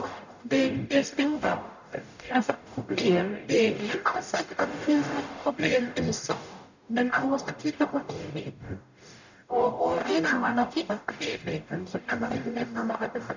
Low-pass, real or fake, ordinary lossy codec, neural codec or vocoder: 7.2 kHz; fake; none; codec, 44.1 kHz, 0.9 kbps, DAC